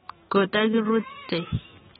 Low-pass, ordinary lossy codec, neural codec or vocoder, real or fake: 19.8 kHz; AAC, 16 kbps; autoencoder, 48 kHz, 128 numbers a frame, DAC-VAE, trained on Japanese speech; fake